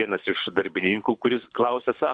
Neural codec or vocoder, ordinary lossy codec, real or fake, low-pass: codec, 24 kHz, 6 kbps, HILCodec; Opus, 64 kbps; fake; 9.9 kHz